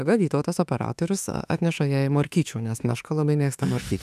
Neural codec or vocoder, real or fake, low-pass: autoencoder, 48 kHz, 32 numbers a frame, DAC-VAE, trained on Japanese speech; fake; 14.4 kHz